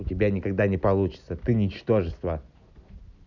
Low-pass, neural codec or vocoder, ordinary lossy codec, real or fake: 7.2 kHz; none; none; real